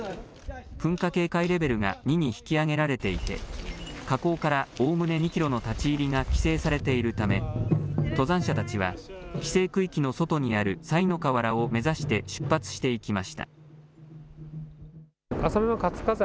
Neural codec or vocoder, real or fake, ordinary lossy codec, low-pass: none; real; none; none